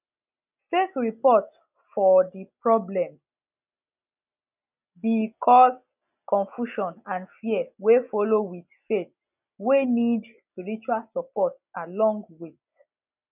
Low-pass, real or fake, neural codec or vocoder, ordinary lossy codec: 3.6 kHz; real; none; none